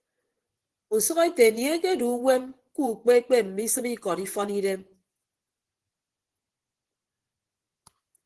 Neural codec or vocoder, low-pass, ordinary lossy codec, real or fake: vocoder, 24 kHz, 100 mel bands, Vocos; 10.8 kHz; Opus, 16 kbps; fake